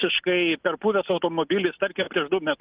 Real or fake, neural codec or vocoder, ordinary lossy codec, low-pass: real; none; Opus, 32 kbps; 3.6 kHz